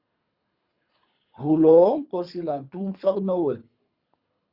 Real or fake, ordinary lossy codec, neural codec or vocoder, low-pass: fake; Opus, 64 kbps; codec, 24 kHz, 3 kbps, HILCodec; 5.4 kHz